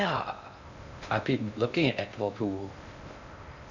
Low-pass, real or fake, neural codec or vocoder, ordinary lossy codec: 7.2 kHz; fake; codec, 16 kHz in and 24 kHz out, 0.6 kbps, FocalCodec, streaming, 2048 codes; none